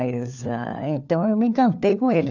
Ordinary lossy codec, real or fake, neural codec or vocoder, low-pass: none; fake; codec, 16 kHz, 4 kbps, FunCodec, trained on LibriTTS, 50 frames a second; 7.2 kHz